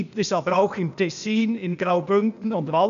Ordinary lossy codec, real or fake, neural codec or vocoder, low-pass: AAC, 96 kbps; fake; codec, 16 kHz, 0.8 kbps, ZipCodec; 7.2 kHz